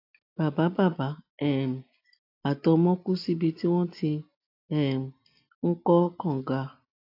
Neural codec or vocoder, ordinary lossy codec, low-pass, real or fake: none; AAC, 32 kbps; 5.4 kHz; real